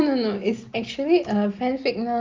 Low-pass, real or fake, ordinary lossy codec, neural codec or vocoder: 7.2 kHz; real; Opus, 32 kbps; none